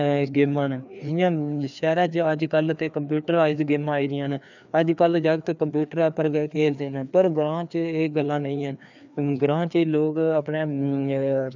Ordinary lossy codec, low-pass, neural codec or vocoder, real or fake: none; 7.2 kHz; codec, 16 kHz, 2 kbps, FreqCodec, larger model; fake